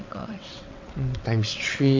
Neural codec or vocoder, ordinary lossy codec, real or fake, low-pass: vocoder, 22.05 kHz, 80 mel bands, WaveNeXt; MP3, 48 kbps; fake; 7.2 kHz